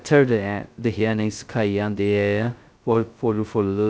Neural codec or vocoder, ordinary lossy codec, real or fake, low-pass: codec, 16 kHz, 0.2 kbps, FocalCodec; none; fake; none